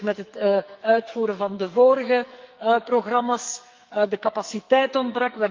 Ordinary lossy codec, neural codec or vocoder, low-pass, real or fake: Opus, 24 kbps; codec, 44.1 kHz, 2.6 kbps, SNAC; 7.2 kHz; fake